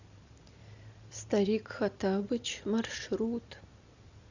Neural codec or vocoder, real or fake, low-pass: none; real; 7.2 kHz